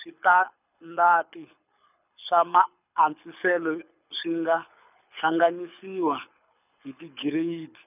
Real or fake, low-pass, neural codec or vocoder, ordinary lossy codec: fake; 3.6 kHz; codec, 24 kHz, 6 kbps, HILCodec; none